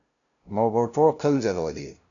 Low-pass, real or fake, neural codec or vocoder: 7.2 kHz; fake; codec, 16 kHz, 0.5 kbps, FunCodec, trained on LibriTTS, 25 frames a second